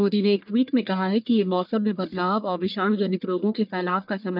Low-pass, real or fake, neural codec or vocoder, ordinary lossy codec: 5.4 kHz; fake; codec, 44.1 kHz, 1.7 kbps, Pupu-Codec; none